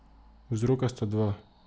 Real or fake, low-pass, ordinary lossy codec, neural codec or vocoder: real; none; none; none